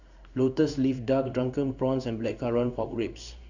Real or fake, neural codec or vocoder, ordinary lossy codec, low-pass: fake; codec, 16 kHz in and 24 kHz out, 1 kbps, XY-Tokenizer; none; 7.2 kHz